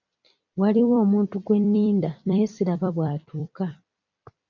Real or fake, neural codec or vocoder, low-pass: fake; vocoder, 44.1 kHz, 128 mel bands every 256 samples, BigVGAN v2; 7.2 kHz